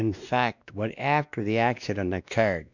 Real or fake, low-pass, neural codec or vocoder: fake; 7.2 kHz; codec, 16 kHz, 1 kbps, X-Codec, WavLM features, trained on Multilingual LibriSpeech